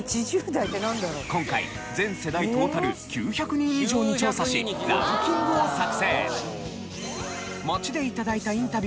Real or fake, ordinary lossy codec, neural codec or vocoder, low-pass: real; none; none; none